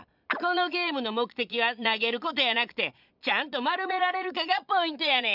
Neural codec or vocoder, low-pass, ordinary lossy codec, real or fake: vocoder, 22.05 kHz, 80 mel bands, Vocos; 5.4 kHz; none; fake